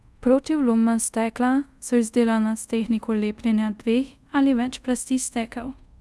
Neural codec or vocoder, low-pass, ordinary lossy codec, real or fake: codec, 24 kHz, 0.5 kbps, DualCodec; none; none; fake